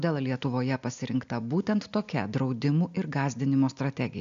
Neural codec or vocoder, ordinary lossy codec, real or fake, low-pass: none; AAC, 64 kbps; real; 7.2 kHz